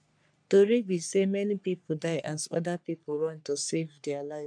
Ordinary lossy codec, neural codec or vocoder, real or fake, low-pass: none; codec, 44.1 kHz, 3.4 kbps, Pupu-Codec; fake; 9.9 kHz